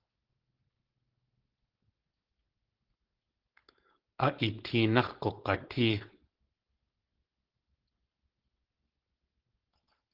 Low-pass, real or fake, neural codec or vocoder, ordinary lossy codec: 5.4 kHz; fake; codec, 16 kHz, 4.8 kbps, FACodec; Opus, 16 kbps